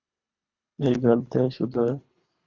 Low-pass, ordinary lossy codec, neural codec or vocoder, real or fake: 7.2 kHz; Opus, 64 kbps; codec, 24 kHz, 3 kbps, HILCodec; fake